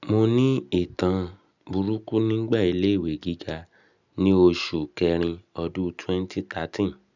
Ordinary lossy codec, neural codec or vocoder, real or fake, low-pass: none; none; real; 7.2 kHz